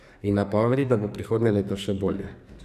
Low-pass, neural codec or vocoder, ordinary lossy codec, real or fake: 14.4 kHz; codec, 44.1 kHz, 2.6 kbps, SNAC; none; fake